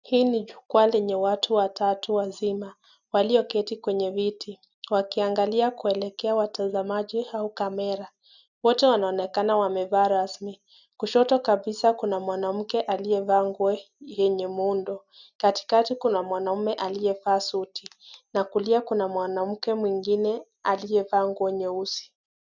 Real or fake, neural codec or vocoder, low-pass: real; none; 7.2 kHz